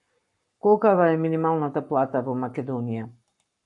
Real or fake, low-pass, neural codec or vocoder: fake; 10.8 kHz; codec, 44.1 kHz, 7.8 kbps, Pupu-Codec